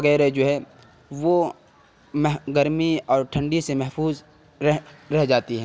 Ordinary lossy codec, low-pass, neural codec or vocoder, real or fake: none; none; none; real